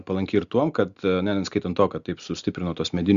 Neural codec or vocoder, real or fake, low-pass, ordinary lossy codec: none; real; 7.2 kHz; Opus, 64 kbps